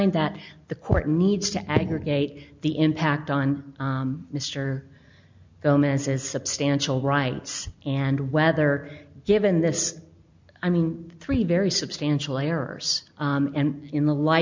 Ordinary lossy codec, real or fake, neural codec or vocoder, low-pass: MP3, 64 kbps; real; none; 7.2 kHz